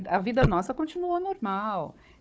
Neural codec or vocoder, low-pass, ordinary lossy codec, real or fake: codec, 16 kHz, 4 kbps, FunCodec, trained on Chinese and English, 50 frames a second; none; none; fake